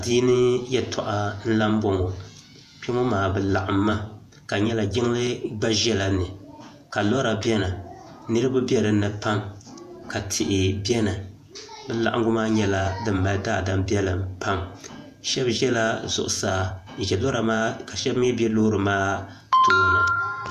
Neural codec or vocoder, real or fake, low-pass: vocoder, 48 kHz, 128 mel bands, Vocos; fake; 14.4 kHz